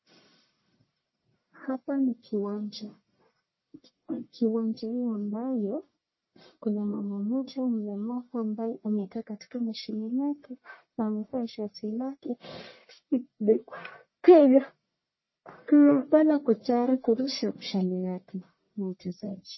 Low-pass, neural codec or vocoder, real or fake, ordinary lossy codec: 7.2 kHz; codec, 44.1 kHz, 1.7 kbps, Pupu-Codec; fake; MP3, 24 kbps